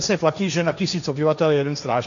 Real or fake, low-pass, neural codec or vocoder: fake; 7.2 kHz; codec, 16 kHz, 1.1 kbps, Voila-Tokenizer